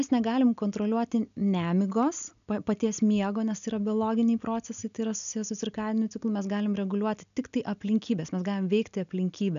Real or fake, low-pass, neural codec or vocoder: real; 7.2 kHz; none